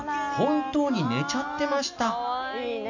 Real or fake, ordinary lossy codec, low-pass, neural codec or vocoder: real; none; 7.2 kHz; none